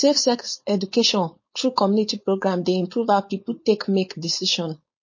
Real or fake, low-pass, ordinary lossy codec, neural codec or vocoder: fake; 7.2 kHz; MP3, 32 kbps; codec, 16 kHz, 4.8 kbps, FACodec